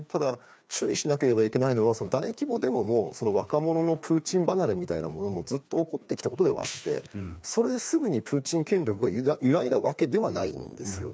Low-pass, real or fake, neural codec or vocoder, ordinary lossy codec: none; fake; codec, 16 kHz, 2 kbps, FreqCodec, larger model; none